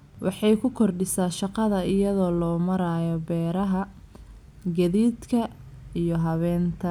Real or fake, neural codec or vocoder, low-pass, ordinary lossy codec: real; none; 19.8 kHz; none